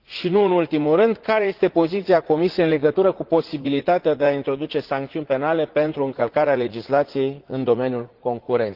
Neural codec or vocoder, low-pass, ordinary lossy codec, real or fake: vocoder, 44.1 kHz, 80 mel bands, Vocos; 5.4 kHz; Opus, 16 kbps; fake